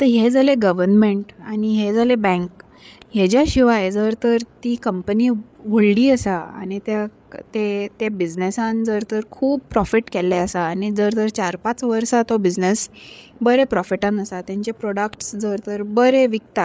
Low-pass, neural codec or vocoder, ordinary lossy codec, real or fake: none; codec, 16 kHz, 8 kbps, FreqCodec, larger model; none; fake